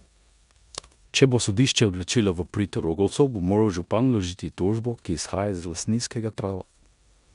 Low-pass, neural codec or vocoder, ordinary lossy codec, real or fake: 10.8 kHz; codec, 16 kHz in and 24 kHz out, 0.9 kbps, LongCat-Audio-Codec, four codebook decoder; none; fake